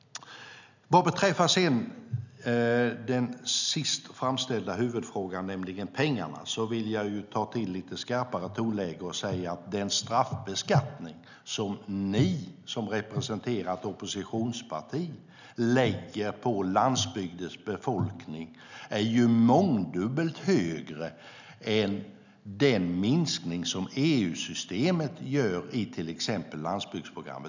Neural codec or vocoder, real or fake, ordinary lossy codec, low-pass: none; real; none; 7.2 kHz